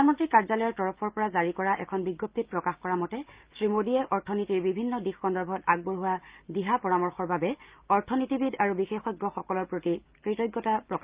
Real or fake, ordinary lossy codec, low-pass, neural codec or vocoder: real; Opus, 32 kbps; 3.6 kHz; none